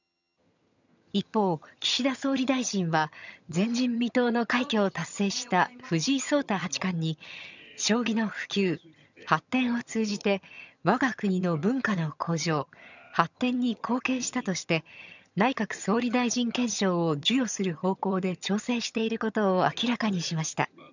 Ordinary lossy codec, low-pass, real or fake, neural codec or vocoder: none; 7.2 kHz; fake; vocoder, 22.05 kHz, 80 mel bands, HiFi-GAN